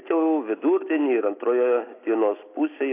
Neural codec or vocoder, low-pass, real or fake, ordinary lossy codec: none; 3.6 kHz; real; AAC, 24 kbps